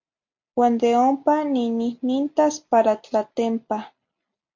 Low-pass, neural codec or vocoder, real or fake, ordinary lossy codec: 7.2 kHz; none; real; MP3, 48 kbps